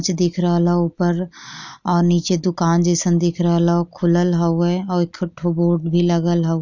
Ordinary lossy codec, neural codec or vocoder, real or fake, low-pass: none; none; real; 7.2 kHz